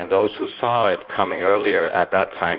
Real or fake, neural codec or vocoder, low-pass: fake; codec, 16 kHz in and 24 kHz out, 1.1 kbps, FireRedTTS-2 codec; 5.4 kHz